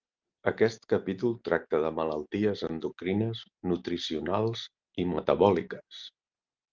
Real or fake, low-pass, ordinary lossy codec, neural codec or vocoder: real; 7.2 kHz; Opus, 24 kbps; none